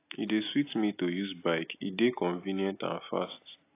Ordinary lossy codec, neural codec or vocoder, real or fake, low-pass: AAC, 24 kbps; none; real; 3.6 kHz